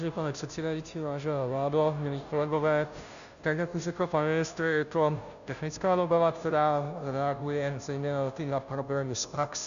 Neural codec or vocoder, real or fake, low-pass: codec, 16 kHz, 0.5 kbps, FunCodec, trained on Chinese and English, 25 frames a second; fake; 7.2 kHz